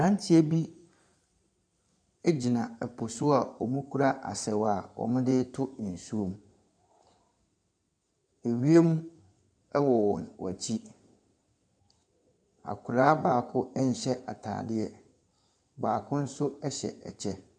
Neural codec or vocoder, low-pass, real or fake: codec, 16 kHz in and 24 kHz out, 2.2 kbps, FireRedTTS-2 codec; 9.9 kHz; fake